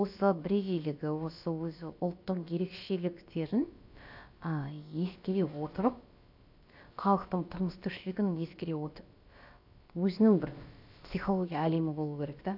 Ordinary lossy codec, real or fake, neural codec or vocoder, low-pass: AAC, 48 kbps; fake; codec, 16 kHz, about 1 kbps, DyCAST, with the encoder's durations; 5.4 kHz